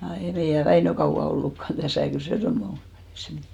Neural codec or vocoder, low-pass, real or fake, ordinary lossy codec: none; 19.8 kHz; real; none